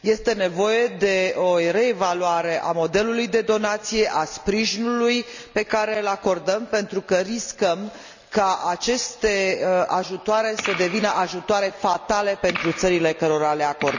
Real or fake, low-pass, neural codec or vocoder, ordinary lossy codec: real; 7.2 kHz; none; none